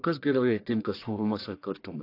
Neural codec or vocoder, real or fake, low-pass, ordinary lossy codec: codec, 44.1 kHz, 1.7 kbps, Pupu-Codec; fake; 5.4 kHz; AAC, 32 kbps